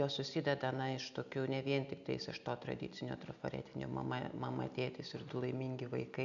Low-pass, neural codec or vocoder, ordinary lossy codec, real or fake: 7.2 kHz; none; MP3, 96 kbps; real